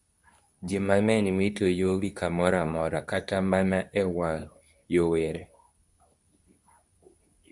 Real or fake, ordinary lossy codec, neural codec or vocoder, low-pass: fake; Opus, 64 kbps; codec, 24 kHz, 0.9 kbps, WavTokenizer, medium speech release version 2; 10.8 kHz